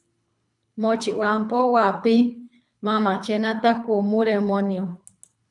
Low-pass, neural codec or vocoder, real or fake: 10.8 kHz; codec, 24 kHz, 3 kbps, HILCodec; fake